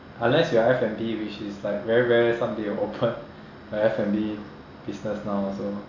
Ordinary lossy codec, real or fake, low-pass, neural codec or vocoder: AAC, 48 kbps; real; 7.2 kHz; none